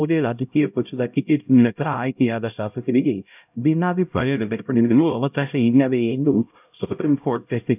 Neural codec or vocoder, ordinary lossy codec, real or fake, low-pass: codec, 16 kHz, 0.5 kbps, X-Codec, HuBERT features, trained on LibriSpeech; none; fake; 3.6 kHz